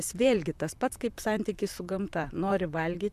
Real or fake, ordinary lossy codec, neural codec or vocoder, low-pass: fake; MP3, 96 kbps; vocoder, 44.1 kHz, 128 mel bands, Pupu-Vocoder; 14.4 kHz